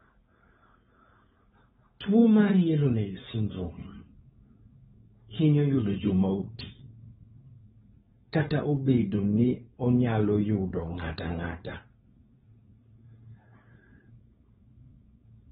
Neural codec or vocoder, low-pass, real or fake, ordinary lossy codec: codec, 16 kHz, 4.8 kbps, FACodec; 7.2 kHz; fake; AAC, 16 kbps